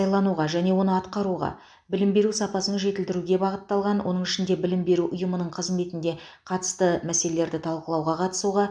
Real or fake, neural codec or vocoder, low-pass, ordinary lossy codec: real; none; 9.9 kHz; none